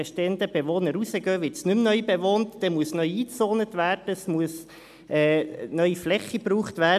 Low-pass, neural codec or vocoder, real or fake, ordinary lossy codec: 14.4 kHz; none; real; MP3, 96 kbps